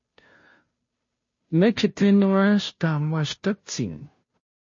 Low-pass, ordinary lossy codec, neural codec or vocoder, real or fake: 7.2 kHz; MP3, 32 kbps; codec, 16 kHz, 0.5 kbps, FunCodec, trained on Chinese and English, 25 frames a second; fake